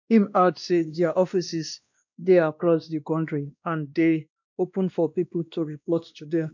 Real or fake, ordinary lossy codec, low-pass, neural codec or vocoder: fake; none; 7.2 kHz; codec, 16 kHz, 1 kbps, X-Codec, WavLM features, trained on Multilingual LibriSpeech